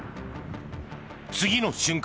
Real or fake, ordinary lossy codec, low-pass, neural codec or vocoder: real; none; none; none